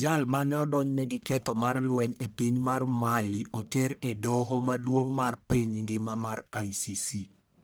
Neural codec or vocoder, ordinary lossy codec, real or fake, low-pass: codec, 44.1 kHz, 1.7 kbps, Pupu-Codec; none; fake; none